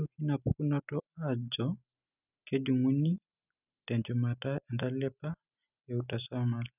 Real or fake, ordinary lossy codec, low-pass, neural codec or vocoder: real; none; 3.6 kHz; none